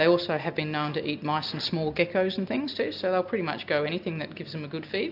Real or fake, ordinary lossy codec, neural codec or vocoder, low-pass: real; AAC, 48 kbps; none; 5.4 kHz